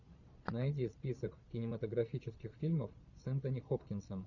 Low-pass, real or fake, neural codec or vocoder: 7.2 kHz; fake; vocoder, 22.05 kHz, 80 mel bands, Vocos